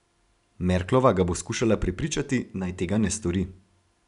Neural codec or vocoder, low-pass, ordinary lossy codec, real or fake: none; 10.8 kHz; none; real